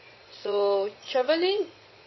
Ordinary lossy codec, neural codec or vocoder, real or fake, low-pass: MP3, 24 kbps; vocoder, 22.05 kHz, 80 mel bands, Vocos; fake; 7.2 kHz